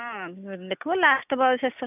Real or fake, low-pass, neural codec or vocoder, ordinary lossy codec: real; 3.6 kHz; none; none